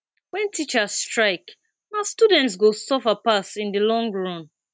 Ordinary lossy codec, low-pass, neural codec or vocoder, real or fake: none; none; none; real